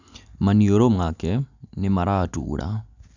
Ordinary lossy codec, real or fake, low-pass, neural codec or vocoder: none; real; 7.2 kHz; none